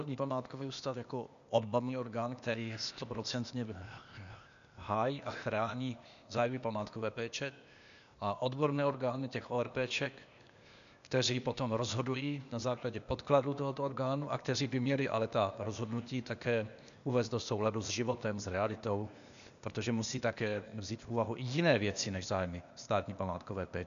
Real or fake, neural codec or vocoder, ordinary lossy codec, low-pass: fake; codec, 16 kHz, 0.8 kbps, ZipCodec; MP3, 96 kbps; 7.2 kHz